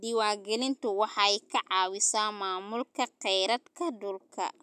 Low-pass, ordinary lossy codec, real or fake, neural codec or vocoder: 14.4 kHz; none; real; none